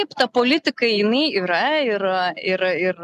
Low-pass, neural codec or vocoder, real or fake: 14.4 kHz; none; real